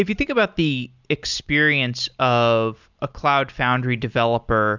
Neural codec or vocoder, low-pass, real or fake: none; 7.2 kHz; real